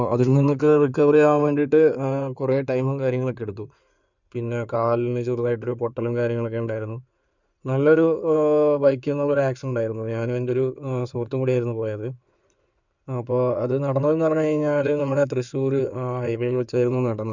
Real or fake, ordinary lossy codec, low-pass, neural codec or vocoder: fake; none; 7.2 kHz; codec, 16 kHz in and 24 kHz out, 2.2 kbps, FireRedTTS-2 codec